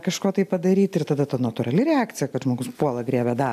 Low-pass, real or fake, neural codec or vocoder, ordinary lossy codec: 14.4 kHz; real; none; AAC, 96 kbps